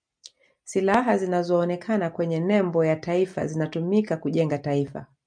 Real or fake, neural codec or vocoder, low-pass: real; none; 9.9 kHz